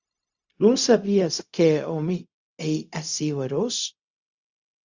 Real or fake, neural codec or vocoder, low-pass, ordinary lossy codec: fake; codec, 16 kHz, 0.4 kbps, LongCat-Audio-Codec; 7.2 kHz; Opus, 64 kbps